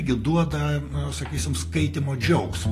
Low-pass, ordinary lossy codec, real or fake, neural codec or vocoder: 14.4 kHz; AAC, 48 kbps; real; none